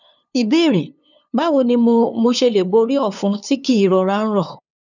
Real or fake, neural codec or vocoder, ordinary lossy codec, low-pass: fake; codec, 16 kHz, 2 kbps, FunCodec, trained on LibriTTS, 25 frames a second; none; 7.2 kHz